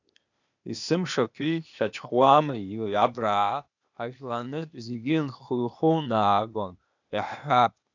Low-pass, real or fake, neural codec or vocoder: 7.2 kHz; fake; codec, 16 kHz, 0.8 kbps, ZipCodec